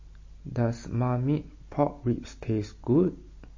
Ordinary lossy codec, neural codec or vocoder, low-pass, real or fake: MP3, 32 kbps; none; 7.2 kHz; real